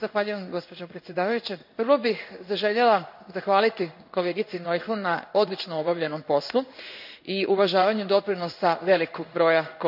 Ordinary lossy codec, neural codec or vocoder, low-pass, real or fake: none; codec, 16 kHz in and 24 kHz out, 1 kbps, XY-Tokenizer; 5.4 kHz; fake